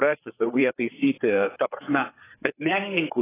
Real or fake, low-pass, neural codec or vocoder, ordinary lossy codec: fake; 3.6 kHz; codec, 16 kHz, 4 kbps, FreqCodec, larger model; AAC, 16 kbps